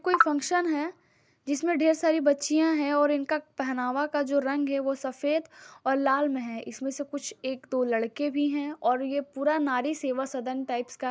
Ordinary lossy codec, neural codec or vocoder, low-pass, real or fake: none; none; none; real